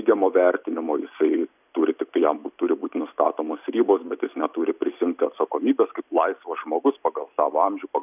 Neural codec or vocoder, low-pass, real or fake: none; 3.6 kHz; real